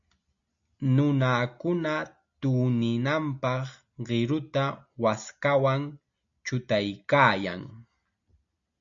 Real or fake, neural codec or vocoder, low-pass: real; none; 7.2 kHz